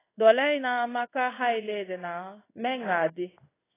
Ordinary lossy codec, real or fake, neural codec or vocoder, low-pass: AAC, 16 kbps; fake; codec, 16 kHz in and 24 kHz out, 1 kbps, XY-Tokenizer; 3.6 kHz